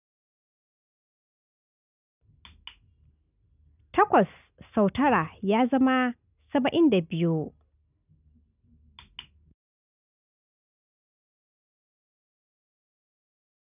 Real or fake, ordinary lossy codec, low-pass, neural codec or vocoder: real; none; 3.6 kHz; none